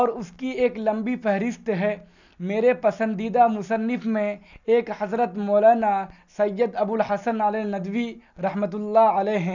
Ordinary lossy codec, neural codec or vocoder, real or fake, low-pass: none; none; real; 7.2 kHz